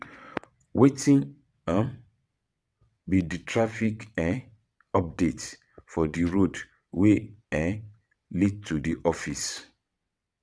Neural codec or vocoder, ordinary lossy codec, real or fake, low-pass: vocoder, 22.05 kHz, 80 mel bands, WaveNeXt; none; fake; none